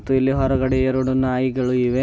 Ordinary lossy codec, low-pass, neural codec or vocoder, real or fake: none; none; none; real